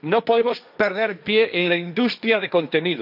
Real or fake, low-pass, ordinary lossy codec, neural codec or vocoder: fake; 5.4 kHz; none; codec, 16 kHz, 1.1 kbps, Voila-Tokenizer